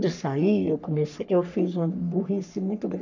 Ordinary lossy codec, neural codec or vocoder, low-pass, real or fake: none; codec, 44.1 kHz, 3.4 kbps, Pupu-Codec; 7.2 kHz; fake